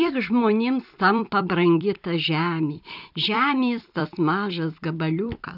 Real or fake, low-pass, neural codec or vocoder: fake; 5.4 kHz; vocoder, 44.1 kHz, 128 mel bands every 256 samples, BigVGAN v2